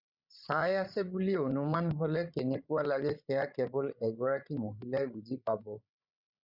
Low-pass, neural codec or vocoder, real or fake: 5.4 kHz; codec, 16 kHz, 16 kbps, FreqCodec, larger model; fake